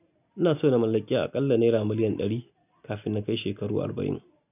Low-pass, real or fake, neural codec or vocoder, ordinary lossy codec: 3.6 kHz; real; none; none